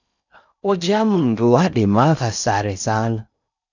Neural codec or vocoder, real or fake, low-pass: codec, 16 kHz in and 24 kHz out, 0.6 kbps, FocalCodec, streaming, 4096 codes; fake; 7.2 kHz